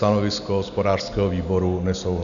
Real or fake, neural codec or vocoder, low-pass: real; none; 7.2 kHz